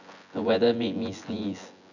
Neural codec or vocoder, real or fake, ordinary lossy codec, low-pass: vocoder, 24 kHz, 100 mel bands, Vocos; fake; none; 7.2 kHz